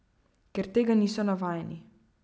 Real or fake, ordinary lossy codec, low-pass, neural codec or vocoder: real; none; none; none